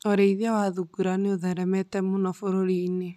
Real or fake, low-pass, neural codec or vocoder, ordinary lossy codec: real; 14.4 kHz; none; none